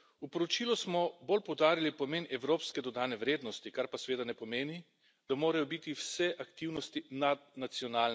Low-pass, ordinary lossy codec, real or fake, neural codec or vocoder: none; none; real; none